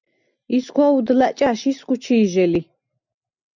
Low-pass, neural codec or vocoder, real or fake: 7.2 kHz; none; real